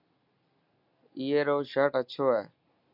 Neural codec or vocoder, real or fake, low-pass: none; real; 5.4 kHz